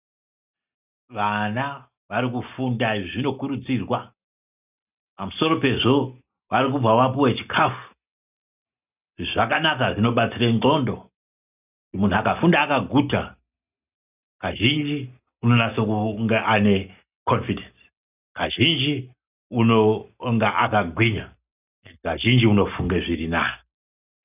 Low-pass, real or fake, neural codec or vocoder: 3.6 kHz; real; none